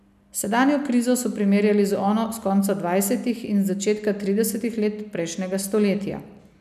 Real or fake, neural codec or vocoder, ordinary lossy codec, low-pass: real; none; none; 14.4 kHz